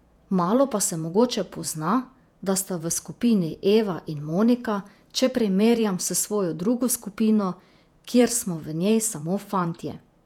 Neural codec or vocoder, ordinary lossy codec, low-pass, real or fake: vocoder, 48 kHz, 128 mel bands, Vocos; none; 19.8 kHz; fake